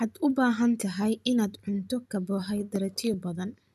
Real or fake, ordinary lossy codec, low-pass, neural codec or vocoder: real; none; 14.4 kHz; none